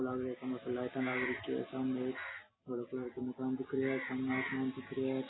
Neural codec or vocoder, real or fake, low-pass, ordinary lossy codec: none; real; 7.2 kHz; AAC, 16 kbps